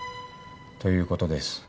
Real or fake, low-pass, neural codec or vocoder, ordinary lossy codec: real; none; none; none